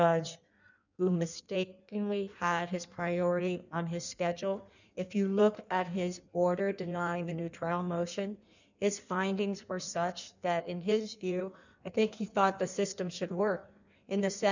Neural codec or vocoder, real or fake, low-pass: codec, 16 kHz in and 24 kHz out, 1.1 kbps, FireRedTTS-2 codec; fake; 7.2 kHz